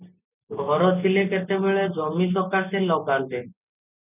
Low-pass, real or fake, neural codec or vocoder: 3.6 kHz; real; none